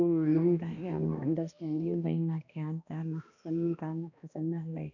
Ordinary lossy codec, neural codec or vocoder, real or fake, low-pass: none; codec, 16 kHz, 1 kbps, X-Codec, HuBERT features, trained on balanced general audio; fake; 7.2 kHz